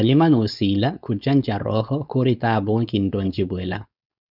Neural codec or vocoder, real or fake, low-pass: codec, 16 kHz, 4.8 kbps, FACodec; fake; 5.4 kHz